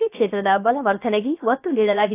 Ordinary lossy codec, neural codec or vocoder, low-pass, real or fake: AAC, 24 kbps; codec, 16 kHz, about 1 kbps, DyCAST, with the encoder's durations; 3.6 kHz; fake